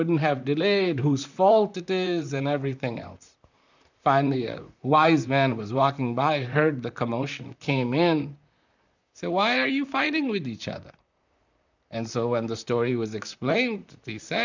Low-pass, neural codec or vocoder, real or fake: 7.2 kHz; vocoder, 44.1 kHz, 128 mel bands, Pupu-Vocoder; fake